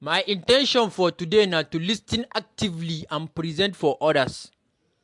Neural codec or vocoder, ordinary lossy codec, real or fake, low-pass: none; MP3, 64 kbps; real; 10.8 kHz